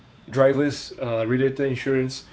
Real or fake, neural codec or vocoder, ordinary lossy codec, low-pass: fake; codec, 16 kHz, 4 kbps, X-Codec, WavLM features, trained on Multilingual LibriSpeech; none; none